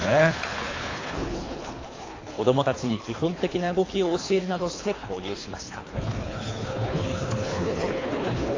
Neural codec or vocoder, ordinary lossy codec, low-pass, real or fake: codec, 24 kHz, 3 kbps, HILCodec; AAC, 32 kbps; 7.2 kHz; fake